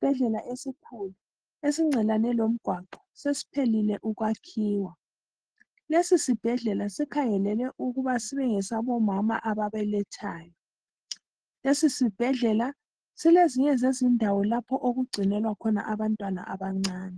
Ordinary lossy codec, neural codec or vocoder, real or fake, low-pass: Opus, 16 kbps; none; real; 9.9 kHz